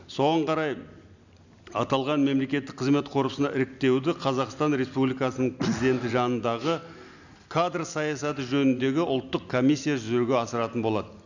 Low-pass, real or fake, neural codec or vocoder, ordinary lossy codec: 7.2 kHz; real; none; none